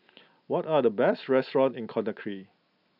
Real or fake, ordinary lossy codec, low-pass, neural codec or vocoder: real; none; 5.4 kHz; none